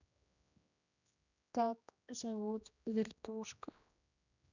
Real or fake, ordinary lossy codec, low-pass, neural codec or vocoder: fake; none; 7.2 kHz; codec, 16 kHz, 1 kbps, X-Codec, HuBERT features, trained on general audio